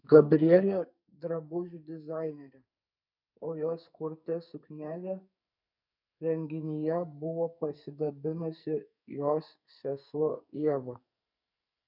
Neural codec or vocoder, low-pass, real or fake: codec, 44.1 kHz, 2.6 kbps, SNAC; 5.4 kHz; fake